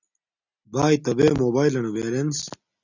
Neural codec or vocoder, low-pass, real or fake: none; 7.2 kHz; real